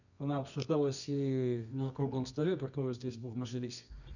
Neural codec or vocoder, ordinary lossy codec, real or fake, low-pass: codec, 24 kHz, 0.9 kbps, WavTokenizer, medium music audio release; none; fake; 7.2 kHz